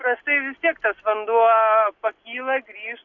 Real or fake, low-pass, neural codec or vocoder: real; 7.2 kHz; none